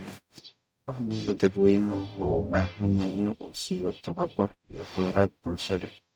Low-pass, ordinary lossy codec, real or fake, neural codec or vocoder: none; none; fake; codec, 44.1 kHz, 0.9 kbps, DAC